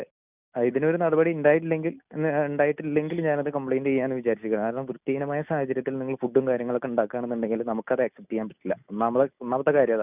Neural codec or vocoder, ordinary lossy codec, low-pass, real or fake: none; none; 3.6 kHz; real